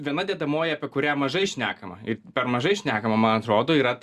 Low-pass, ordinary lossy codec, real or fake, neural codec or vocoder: 14.4 kHz; Opus, 64 kbps; real; none